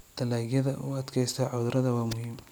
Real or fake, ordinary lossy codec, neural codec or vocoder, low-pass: real; none; none; none